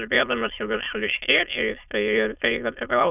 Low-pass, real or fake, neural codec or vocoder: 3.6 kHz; fake; autoencoder, 22.05 kHz, a latent of 192 numbers a frame, VITS, trained on many speakers